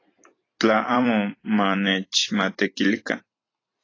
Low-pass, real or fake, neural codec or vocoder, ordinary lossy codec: 7.2 kHz; fake; vocoder, 44.1 kHz, 128 mel bands every 256 samples, BigVGAN v2; AAC, 32 kbps